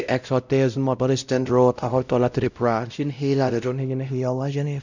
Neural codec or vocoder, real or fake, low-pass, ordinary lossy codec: codec, 16 kHz, 0.5 kbps, X-Codec, WavLM features, trained on Multilingual LibriSpeech; fake; 7.2 kHz; none